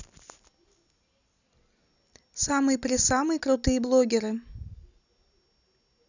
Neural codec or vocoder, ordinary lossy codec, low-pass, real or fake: none; none; 7.2 kHz; real